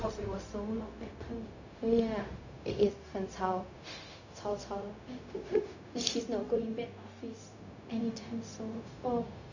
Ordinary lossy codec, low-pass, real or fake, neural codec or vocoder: none; 7.2 kHz; fake; codec, 16 kHz, 0.4 kbps, LongCat-Audio-Codec